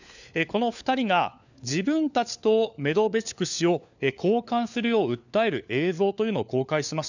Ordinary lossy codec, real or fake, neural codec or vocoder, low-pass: none; fake; codec, 16 kHz, 4 kbps, FunCodec, trained on LibriTTS, 50 frames a second; 7.2 kHz